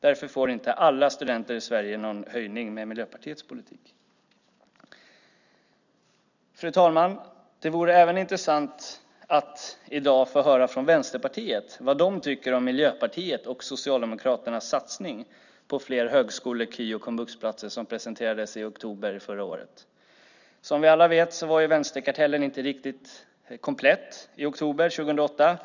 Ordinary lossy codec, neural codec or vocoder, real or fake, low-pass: none; none; real; 7.2 kHz